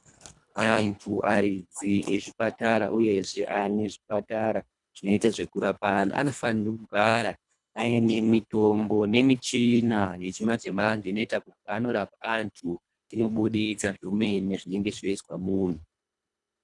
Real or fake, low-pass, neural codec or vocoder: fake; 10.8 kHz; codec, 24 kHz, 1.5 kbps, HILCodec